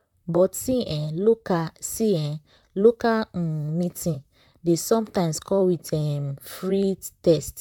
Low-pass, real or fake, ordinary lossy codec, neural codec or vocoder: 19.8 kHz; fake; none; vocoder, 44.1 kHz, 128 mel bands, Pupu-Vocoder